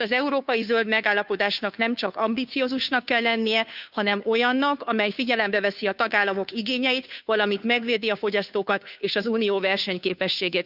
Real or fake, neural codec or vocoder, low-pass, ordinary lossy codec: fake; codec, 16 kHz, 2 kbps, FunCodec, trained on Chinese and English, 25 frames a second; 5.4 kHz; none